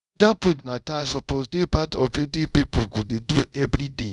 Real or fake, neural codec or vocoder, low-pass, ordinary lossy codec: fake; codec, 24 kHz, 0.5 kbps, DualCodec; 10.8 kHz; none